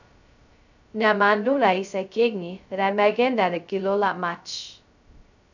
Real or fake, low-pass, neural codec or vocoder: fake; 7.2 kHz; codec, 16 kHz, 0.2 kbps, FocalCodec